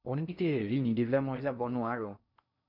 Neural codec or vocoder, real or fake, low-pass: codec, 16 kHz in and 24 kHz out, 0.6 kbps, FocalCodec, streaming, 2048 codes; fake; 5.4 kHz